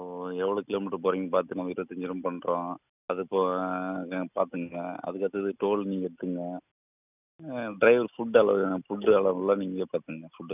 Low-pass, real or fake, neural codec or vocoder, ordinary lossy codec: 3.6 kHz; real; none; none